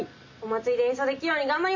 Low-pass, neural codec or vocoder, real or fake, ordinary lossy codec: 7.2 kHz; none; real; MP3, 48 kbps